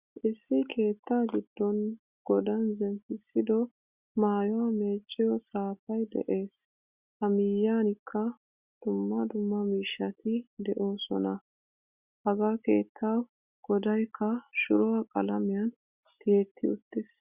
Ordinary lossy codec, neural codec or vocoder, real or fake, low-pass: Opus, 64 kbps; none; real; 3.6 kHz